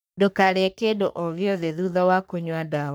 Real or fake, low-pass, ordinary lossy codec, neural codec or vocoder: fake; none; none; codec, 44.1 kHz, 3.4 kbps, Pupu-Codec